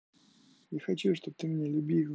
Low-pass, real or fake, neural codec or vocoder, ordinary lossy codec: none; real; none; none